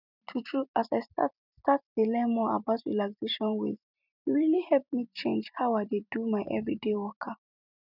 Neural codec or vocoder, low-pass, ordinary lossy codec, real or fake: vocoder, 44.1 kHz, 128 mel bands every 256 samples, BigVGAN v2; 5.4 kHz; none; fake